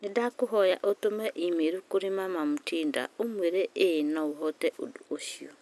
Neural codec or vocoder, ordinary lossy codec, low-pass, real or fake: none; none; none; real